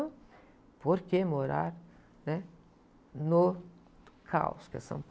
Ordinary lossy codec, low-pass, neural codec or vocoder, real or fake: none; none; none; real